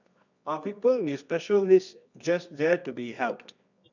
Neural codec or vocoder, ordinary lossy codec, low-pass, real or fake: codec, 24 kHz, 0.9 kbps, WavTokenizer, medium music audio release; none; 7.2 kHz; fake